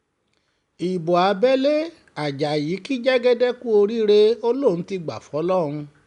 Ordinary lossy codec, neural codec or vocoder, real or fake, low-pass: none; none; real; 10.8 kHz